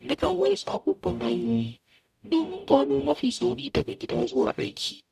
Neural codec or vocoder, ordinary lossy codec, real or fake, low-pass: codec, 44.1 kHz, 0.9 kbps, DAC; none; fake; 14.4 kHz